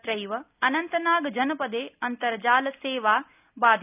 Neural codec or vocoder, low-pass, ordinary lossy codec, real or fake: none; 3.6 kHz; none; real